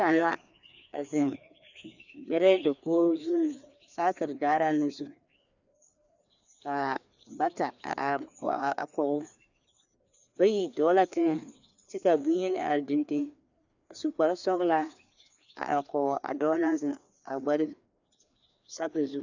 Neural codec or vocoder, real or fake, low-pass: codec, 16 kHz, 2 kbps, FreqCodec, larger model; fake; 7.2 kHz